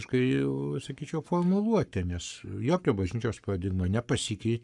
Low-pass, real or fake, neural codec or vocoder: 10.8 kHz; fake; codec, 44.1 kHz, 7.8 kbps, Pupu-Codec